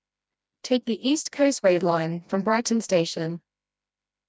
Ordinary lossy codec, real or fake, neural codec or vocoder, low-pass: none; fake; codec, 16 kHz, 1 kbps, FreqCodec, smaller model; none